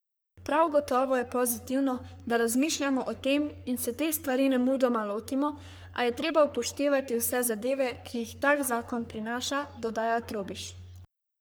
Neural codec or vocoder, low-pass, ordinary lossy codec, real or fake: codec, 44.1 kHz, 3.4 kbps, Pupu-Codec; none; none; fake